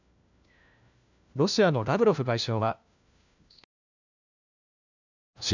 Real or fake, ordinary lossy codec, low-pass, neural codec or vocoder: fake; none; 7.2 kHz; codec, 16 kHz, 1 kbps, FunCodec, trained on LibriTTS, 50 frames a second